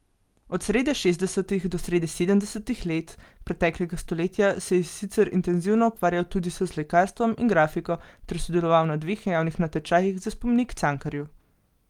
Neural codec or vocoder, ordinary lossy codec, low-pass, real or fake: none; Opus, 32 kbps; 19.8 kHz; real